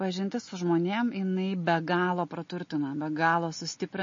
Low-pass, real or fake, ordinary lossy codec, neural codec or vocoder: 7.2 kHz; real; MP3, 32 kbps; none